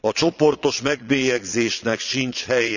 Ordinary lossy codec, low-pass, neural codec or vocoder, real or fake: none; 7.2 kHz; vocoder, 22.05 kHz, 80 mel bands, Vocos; fake